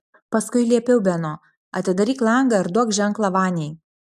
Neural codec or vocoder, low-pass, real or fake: none; 14.4 kHz; real